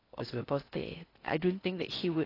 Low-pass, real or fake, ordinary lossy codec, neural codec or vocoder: 5.4 kHz; fake; AAC, 24 kbps; codec, 16 kHz in and 24 kHz out, 0.6 kbps, FocalCodec, streaming, 4096 codes